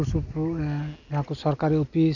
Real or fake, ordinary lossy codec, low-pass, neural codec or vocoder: real; none; 7.2 kHz; none